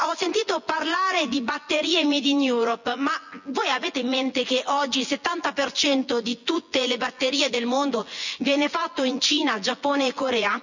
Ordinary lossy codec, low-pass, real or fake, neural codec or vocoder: none; 7.2 kHz; fake; vocoder, 24 kHz, 100 mel bands, Vocos